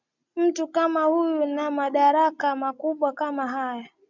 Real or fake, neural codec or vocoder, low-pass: real; none; 7.2 kHz